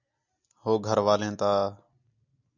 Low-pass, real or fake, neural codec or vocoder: 7.2 kHz; real; none